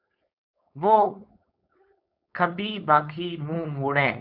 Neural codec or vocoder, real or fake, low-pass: codec, 16 kHz, 4.8 kbps, FACodec; fake; 5.4 kHz